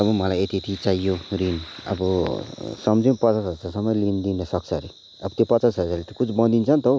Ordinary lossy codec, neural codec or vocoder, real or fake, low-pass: none; none; real; none